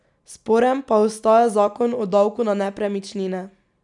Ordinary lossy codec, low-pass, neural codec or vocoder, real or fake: none; 10.8 kHz; none; real